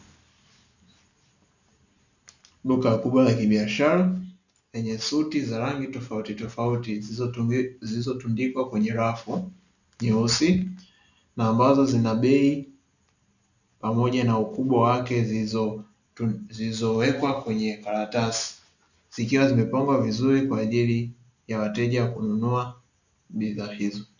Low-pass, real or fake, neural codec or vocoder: 7.2 kHz; real; none